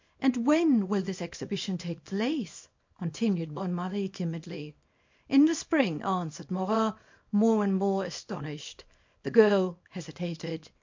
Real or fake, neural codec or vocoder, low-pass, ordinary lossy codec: fake; codec, 24 kHz, 0.9 kbps, WavTokenizer, small release; 7.2 kHz; MP3, 48 kbps